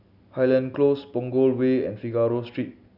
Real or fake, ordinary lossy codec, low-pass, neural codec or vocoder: real; none; 5.4 kHz; none